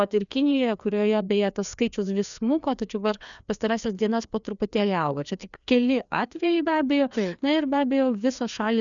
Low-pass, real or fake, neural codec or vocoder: 7.2 kHz; fake; codec, 16 kHz, 2 kbps, FreqCodec, larger model